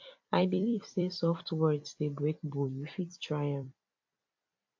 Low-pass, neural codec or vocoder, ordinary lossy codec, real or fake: 7.2 kHz; vocoder, 44.1 kHz, 128 mel bands every 256 samples, BigVGAN v2; AAC, 48 kbps; fake